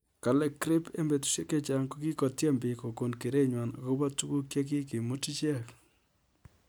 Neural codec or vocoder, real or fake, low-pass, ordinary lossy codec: none; real; none; none